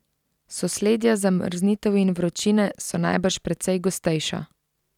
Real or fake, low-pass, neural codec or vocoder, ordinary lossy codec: real; 19.8 kHz; none; none